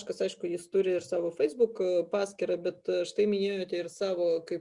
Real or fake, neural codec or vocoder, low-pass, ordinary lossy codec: real; none; 10.8 kHz; Opus, 64 kbps